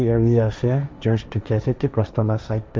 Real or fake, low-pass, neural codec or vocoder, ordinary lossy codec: fake; none; codec, 16 kHz, 1.1 kbps, Voila-Tokenizer; none